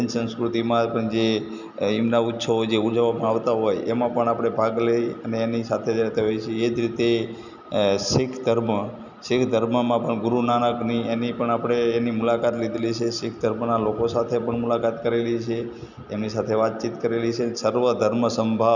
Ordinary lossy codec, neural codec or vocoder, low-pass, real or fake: none; none; 7.2 kHz; real